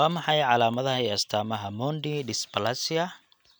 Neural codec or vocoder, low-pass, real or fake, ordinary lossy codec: none; none; real; none